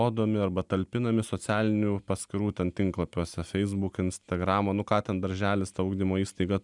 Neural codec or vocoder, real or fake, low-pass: none; real; 10.8 kHz